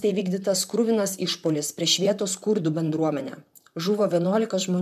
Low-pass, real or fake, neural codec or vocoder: 14.4 kHz; fake; vocoder, 44.1 kHz, 128 mel bands, Pupu-Vocoder